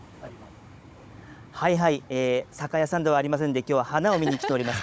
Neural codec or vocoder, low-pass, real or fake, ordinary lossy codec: codec, 16 kHz, 16 kbps, FunCodec, trained on Chinese and English, 50 frames a second; none; fake; none